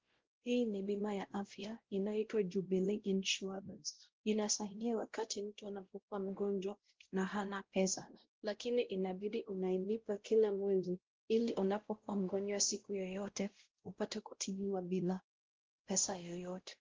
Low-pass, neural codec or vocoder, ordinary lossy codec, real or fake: 7.2 kHz; codec, 16 kHz, 0.5 kbps, X-Codec, WavLM features, trained on Multilingual LibriSpeech; Opus, 16 kbps; fake